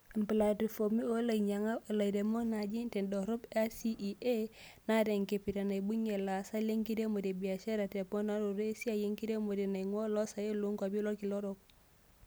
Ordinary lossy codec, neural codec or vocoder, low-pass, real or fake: none; none; none; real